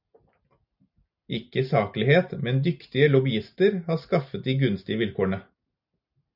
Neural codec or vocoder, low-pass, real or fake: none; 5.4 kHz; real